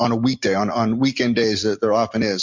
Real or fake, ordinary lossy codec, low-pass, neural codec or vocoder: real; MP3, 64 kbps; 7.2 kHz; none